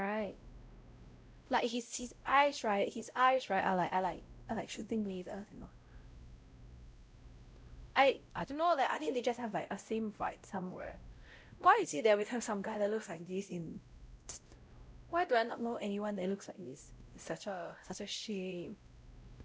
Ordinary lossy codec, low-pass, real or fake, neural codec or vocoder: none; none; fake; codec, 16 kHz, 0.5 kbps, X-Codec, WavLM features, trained on Multilingual LibriSpeech